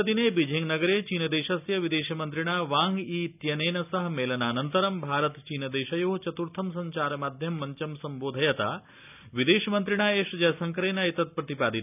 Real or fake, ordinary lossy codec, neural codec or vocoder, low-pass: real; none; none; 3.6 kHz